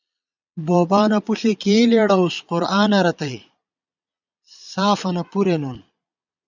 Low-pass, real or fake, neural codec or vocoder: 7.2 kHz; fake; vocoder, 22.05 kHz, 80 mel bands, Vocos